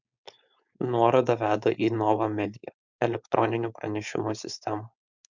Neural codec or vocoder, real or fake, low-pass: codec, 16 kHz, 4.8 kbps, FACodec; fake; 7.2 kHz